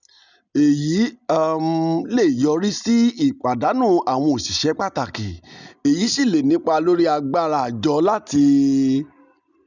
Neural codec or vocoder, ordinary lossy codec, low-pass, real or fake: none; none; 7.2 kHz; real